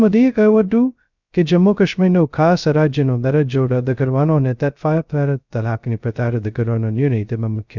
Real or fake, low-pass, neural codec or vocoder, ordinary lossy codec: fake; 7.2 kHz; codec, 16 kHz, 0.2 kbps, FocalCodec; none